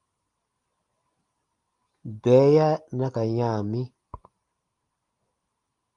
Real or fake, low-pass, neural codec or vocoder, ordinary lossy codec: real; 10.8 kHz; none; Opus, 32 kbps